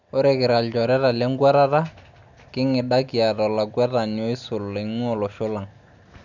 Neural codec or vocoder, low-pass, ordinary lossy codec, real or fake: none; 7.2 kHz; none; real